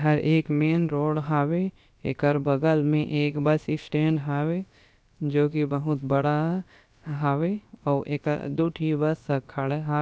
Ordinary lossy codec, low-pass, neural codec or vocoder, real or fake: none; none; codec, 16 kHz, about 1 kbps, DyCAST, with the encoder's durations; fake